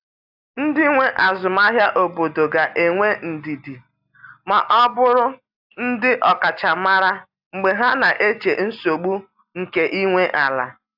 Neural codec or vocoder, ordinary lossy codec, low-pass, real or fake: none; AAC, 48 kbps; 5.4 kHz; real